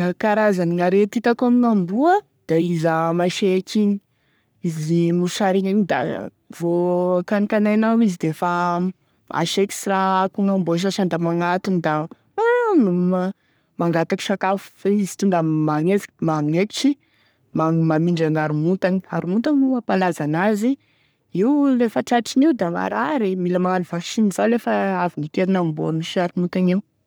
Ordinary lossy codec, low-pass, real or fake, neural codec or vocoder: none; none; fake; codec, 44.1 kHz, 3.4 kbps, Pupu-Codec